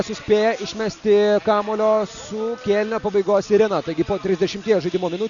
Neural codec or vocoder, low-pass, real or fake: none; 7.2 kHz; real